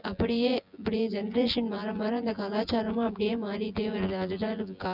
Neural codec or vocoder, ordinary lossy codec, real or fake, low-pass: vocoder, 24 kHz, 100 mel bands, Vocos; none; fake; 5.4 kHz